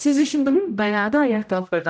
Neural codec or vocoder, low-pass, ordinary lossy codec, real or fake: codec, 16 kHz, 0.5 kbps, X-Codec, HuBERT features, trained on general audio; none; none; fake